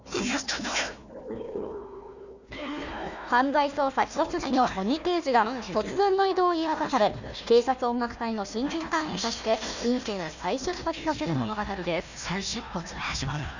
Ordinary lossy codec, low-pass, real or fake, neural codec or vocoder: none; 7.2 kHz; fake; codec, 16 kHz, 1 kbps, FunCodec, trained on Chinese and English, 50 frames a second